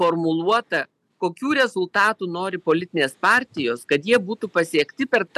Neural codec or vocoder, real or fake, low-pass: none; real; 14.4 kHz